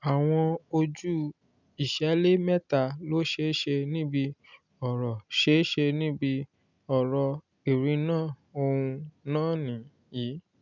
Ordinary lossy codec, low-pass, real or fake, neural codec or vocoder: none; 7.2 kHz; real; none